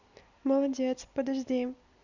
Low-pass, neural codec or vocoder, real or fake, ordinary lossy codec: 7.2 kHz; none; real; none